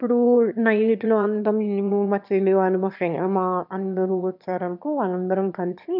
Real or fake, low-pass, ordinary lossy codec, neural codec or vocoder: fake; 5.4 kHz; none; autoencoder, 22.05 kHz, a latent of 192 numbers a frame, VITS, trained on one speaker